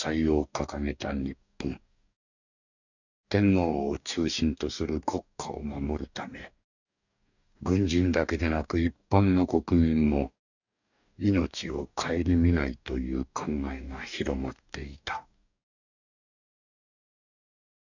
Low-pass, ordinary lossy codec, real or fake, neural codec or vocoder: 7.2 kHz; none; fake; codec, 44.1 kHz, 2.6 kbps, DAC